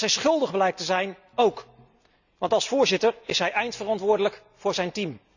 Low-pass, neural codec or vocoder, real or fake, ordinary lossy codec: 7.2 kHz; none; real; none